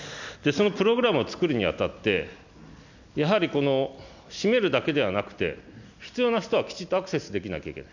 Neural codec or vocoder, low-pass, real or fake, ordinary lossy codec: none; 7.2 kHz; real; none